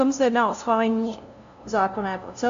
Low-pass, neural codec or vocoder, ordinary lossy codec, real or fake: 7.2 kHz; codec, 16 kHz, 0.5 kbps, FunCodec, trained on LibriTTS, 25 frames a second; AAC, 64 kbps; fake